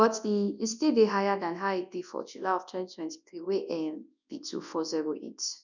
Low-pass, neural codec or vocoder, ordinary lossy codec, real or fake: 7.2 kHz; codec, 24 kHz, 0.9 kbps, WavTokenizer, large speech release; none; fake